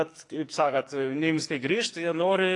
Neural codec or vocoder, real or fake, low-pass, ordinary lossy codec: codec, 44.1 kHz, 2.6 kbps, SNAC; fake; 10.8 kHz; AAC, 48 kbps